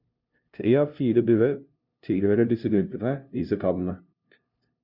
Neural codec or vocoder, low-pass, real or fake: codec, 16 kHz, 0.5 kbps, FunCodec, trained on LibriTTS, 25 frames a second; 5.4 kHz; fake